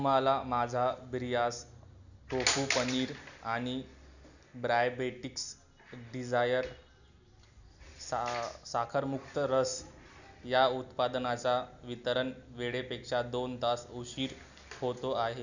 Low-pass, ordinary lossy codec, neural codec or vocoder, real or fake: 7.2 kHz; none; none; real